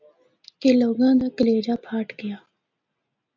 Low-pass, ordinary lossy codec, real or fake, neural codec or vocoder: 7.2 kHz; MP3, 64 kbps; real; none